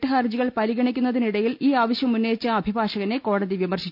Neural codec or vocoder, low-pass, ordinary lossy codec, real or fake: none; 5.4 kHz; none; real